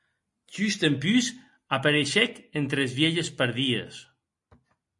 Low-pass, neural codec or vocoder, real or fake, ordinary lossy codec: 10.8 kHz; none; real; MP3, 48 kbps